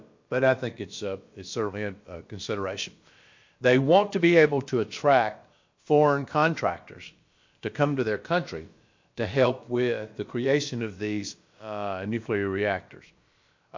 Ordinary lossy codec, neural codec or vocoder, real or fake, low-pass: MP3, 48 kbps; codec, 16 kHz, about 1 kbps, DyCAST, with the encoder's durations; fake; 7.2 kHz